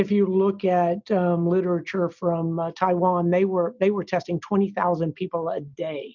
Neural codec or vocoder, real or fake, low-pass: none; real; 7.2 kHz